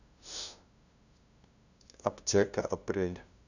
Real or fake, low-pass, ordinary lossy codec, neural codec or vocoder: fake; 7.2 kHz; none; codec, 16 kHz, 0.5 kbps, FunCodec, trained on LibriTTS, 25 frames a second